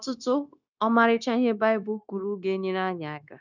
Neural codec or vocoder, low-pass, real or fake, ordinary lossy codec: codec, 16 kHz, 0.9 kbps, LongCat-Audio-Codec; 7.2 kHz; fake; none